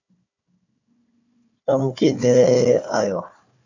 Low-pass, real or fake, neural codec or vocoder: 7.2 kHz; fake; codec, 16 kHz, 4 kbps, FunCodec, trained on Chinese and English, 50 frames a second